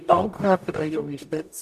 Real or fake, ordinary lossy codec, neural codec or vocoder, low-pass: fake; none; codec, 44.1 kHz, 0.9 kbps, DAC; 14.4 kHz